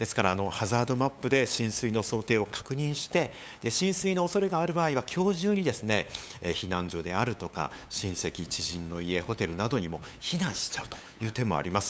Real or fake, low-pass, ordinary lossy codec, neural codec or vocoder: fake; none; none; codec, 16 kHz, 8 kbps, FunCodec, trained on LibriTTS, 25 frames a second